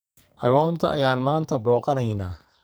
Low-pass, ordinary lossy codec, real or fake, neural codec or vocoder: none; none; fake; codec, 44.1 kHz, 2.6 kbps, SNAC